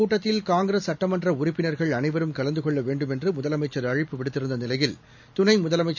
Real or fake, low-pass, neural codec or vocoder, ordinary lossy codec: real; 7.2 kHz; none; none